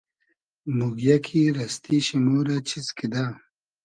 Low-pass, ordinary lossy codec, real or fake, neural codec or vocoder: 9.9 kHz; Opus, 32 kbps; real; none